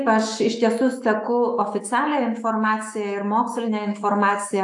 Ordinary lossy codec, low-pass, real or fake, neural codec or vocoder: AAC, 64 kbps; 10.8 kHz; fake; autoencoder, 48 kHz, 128 numbers a frame, DAC-VAE, trained on Japanese speech